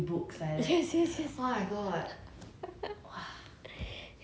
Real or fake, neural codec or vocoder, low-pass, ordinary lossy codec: real; none; none; none